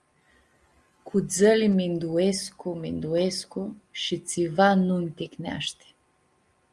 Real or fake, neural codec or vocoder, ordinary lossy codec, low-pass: real; none; Opus, 32 kbps; 10.8 kHz